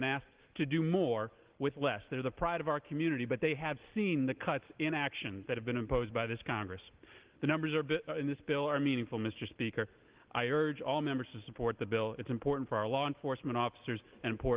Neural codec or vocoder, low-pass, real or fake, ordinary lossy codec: none; 3.6 kHz; real; Opus, 16 kbps